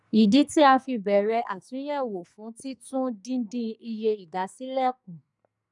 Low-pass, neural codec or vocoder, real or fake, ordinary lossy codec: 10.8 kHz; codec, 44.1 kHz, 2.6 kbps, SNAC; fake; none